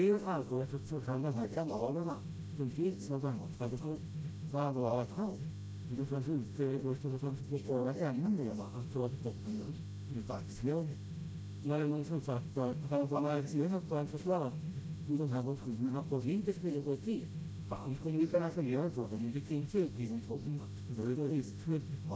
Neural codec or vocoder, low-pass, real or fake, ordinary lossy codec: codec, 16 kHz, 0.5 kbps, FreqCodec, smaller model; none; fake; none